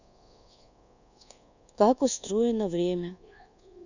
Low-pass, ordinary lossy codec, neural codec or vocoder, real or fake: 7.2 kHz; none; codec, 24 kHz, 1.2 kbps, DualCodec; fake